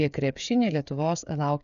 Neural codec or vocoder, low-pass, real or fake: codec, 16 kHz, 8 kbps, FreqCodec, smaller model; 7.2 kHz; fake